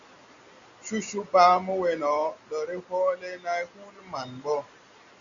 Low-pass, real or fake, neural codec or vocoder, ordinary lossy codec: 7.2 kHz; real; none; Opus, 64 kbps